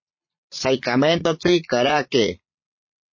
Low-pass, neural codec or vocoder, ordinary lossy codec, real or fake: 7.2 kHz; vocoder, 22.05 kHz, 80 mel bands, Vocos; MP3, 32 kbps; fake